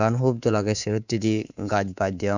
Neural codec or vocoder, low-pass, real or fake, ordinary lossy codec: codec, 24 kHz, 3.1 kbps, DualCodec; 7.2 kHz; fake; none